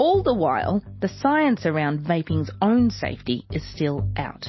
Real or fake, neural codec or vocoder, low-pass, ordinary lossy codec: real; none; 7.2 kHz; MP3, 24 kbps